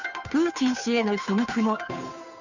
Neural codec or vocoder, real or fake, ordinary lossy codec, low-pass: codec, 16 kHz, 2 kbps, FunCodec, trained on Chinese and English, 25 frames a second; fake; none; 7.2 kHz